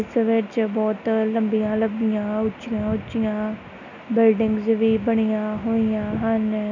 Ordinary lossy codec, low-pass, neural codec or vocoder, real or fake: none; 7.2 kHz; none; real